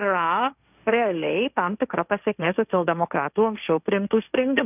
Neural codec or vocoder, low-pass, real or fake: codec, 16 kHz, 1.1 kbps, Voila-Tokenizer; 3.6 kHz; fake